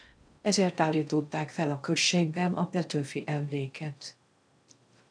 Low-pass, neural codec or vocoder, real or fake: 9.9 kHz; codec, 16 kHz in and 24 kHz out, 0.6 kbps, FocalCodec, streaming, 2048 codes; fake